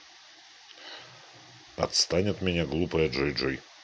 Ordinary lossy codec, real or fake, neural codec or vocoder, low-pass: none; real; none; none